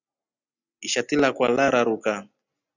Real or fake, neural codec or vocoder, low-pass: real; none; 7.2 kHz